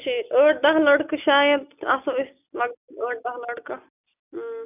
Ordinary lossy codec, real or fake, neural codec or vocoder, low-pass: none; real; none; 3.6 kHz